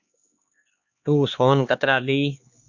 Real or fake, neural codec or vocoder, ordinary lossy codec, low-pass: fake; codec, 16 kHz, 2 kbps, X-Codec, HuBERT features, trained on LibriSpeech; Opus, 64 kbps; 7.2 kHz